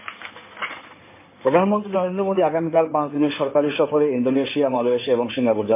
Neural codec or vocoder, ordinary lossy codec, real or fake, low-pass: codec, 16 kHz in and 24 kHz out, 2.2 kbps, FireRedTTS-2 codec; MP3, 24 kbps; fake; 3.6 kHz